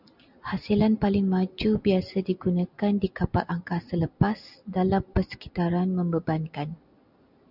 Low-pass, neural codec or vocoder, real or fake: 5.4 kHz; none; real